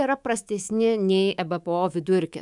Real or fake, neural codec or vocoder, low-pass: fake; codec, 24 kHz, 3.1 kbps, DualCodec; 10.8 kHz